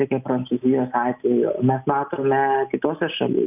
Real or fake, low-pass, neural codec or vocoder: real; 3.6 kHz; none